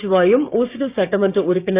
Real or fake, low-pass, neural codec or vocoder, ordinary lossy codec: fake; 3.6 kHz; codec, 16 kHz, 6 kbps, DAC; Opus, 64 kbps